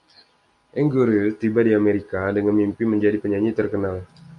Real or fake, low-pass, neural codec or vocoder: real; 10.8 kHz; none